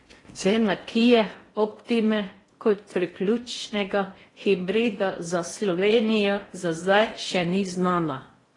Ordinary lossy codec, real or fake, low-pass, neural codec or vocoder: AAC, 32 kbps; fake; 10.8 kHz; codec, 16 kHz in and 24 kHz out, 0.8 kbps, FocalCodec, streaming, 65536 codes